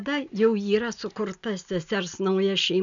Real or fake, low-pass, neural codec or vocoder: real; 7.2 kHz; none